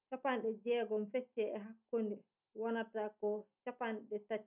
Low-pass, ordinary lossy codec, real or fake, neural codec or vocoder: 3.6 kHz; none; real; none